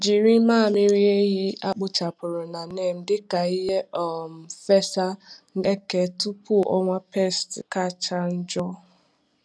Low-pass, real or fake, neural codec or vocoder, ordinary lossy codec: none; real; none; none